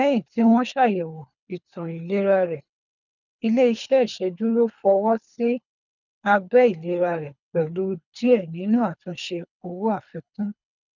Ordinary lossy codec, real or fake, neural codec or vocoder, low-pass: none; fake; codec, 24 kHz, 3 kbps, HILCodec; 7.2 kHz